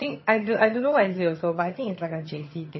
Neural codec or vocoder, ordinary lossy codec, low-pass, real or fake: vocoder, 22.05 kHz, 80 mel bands, HiFi-GAN; MP3, 24 kbps; 7.2 kHz; fake